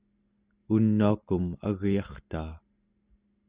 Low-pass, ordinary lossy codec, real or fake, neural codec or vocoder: 3.6 kHz; Opus, 64 kbps; real; none